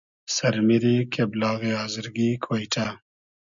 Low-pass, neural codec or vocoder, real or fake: 7.2 kHz; none; real